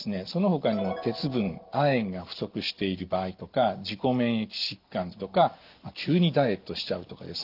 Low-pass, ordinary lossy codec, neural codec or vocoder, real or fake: 5.4 kHz; Opus, 32 kbps; none; real